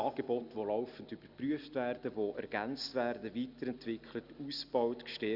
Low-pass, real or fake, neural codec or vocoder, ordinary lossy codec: 5.4 kHz; real; none; Opus, 64 kbps